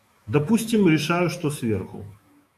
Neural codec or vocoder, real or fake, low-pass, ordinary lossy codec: autoencoder, 48 kHz, 128 numbers a frame, DAC-VAE, trained on Japanese speech; fake; 14.4 kHz; MP3, 64 kbps